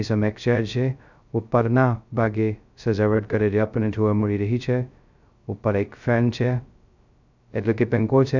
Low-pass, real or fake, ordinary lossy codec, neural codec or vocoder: 7.2 kHz; fake; none; codec, 16 kHz, 0.2 kbps, FocalCodec